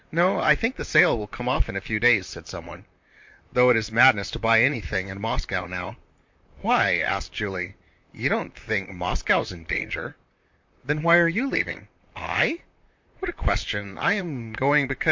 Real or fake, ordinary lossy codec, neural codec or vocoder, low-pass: fake; MP3, 48 kbps; vocoder, 44.1 kHz, 128 mel bands, Pupu-Vocoder; 7.2 kHz